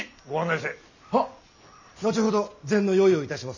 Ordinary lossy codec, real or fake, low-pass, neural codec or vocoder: none; real; 7.2 kHz; none